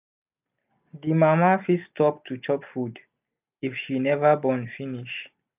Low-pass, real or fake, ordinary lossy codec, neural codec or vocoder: 3.6 kHz; real; none; none